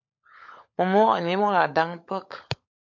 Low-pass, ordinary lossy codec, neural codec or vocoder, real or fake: 7.2 kHz; MP3, 48 kbps; codec, 16 kHz, 16 kbps, FunCodec, trained on LibriTTS, 50 frames a second; fake